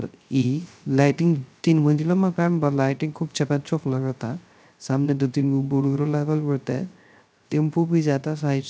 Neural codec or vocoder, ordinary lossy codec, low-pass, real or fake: codec, 16 kHz, 0.3 kbps, FocalCodec; none; none; fake